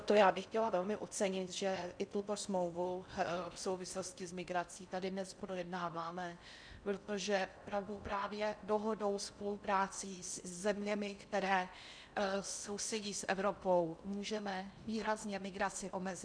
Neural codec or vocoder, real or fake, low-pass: codec, 16 kHz in and 24 kHz out, 0.6 kbps, FocalCodec, streaming, 4096 codes; fake; 9.9 kHz